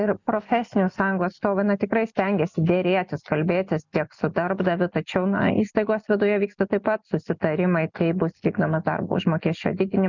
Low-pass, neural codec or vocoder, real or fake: 7.2 kHz; none; real